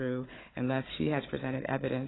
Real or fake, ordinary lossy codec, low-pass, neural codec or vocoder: fake; AAC, 16 kbps; 7.2 kHz; codec, 16 kHz, 16 kbps, FunCodec, trained on Chinese and English, 50 frames a second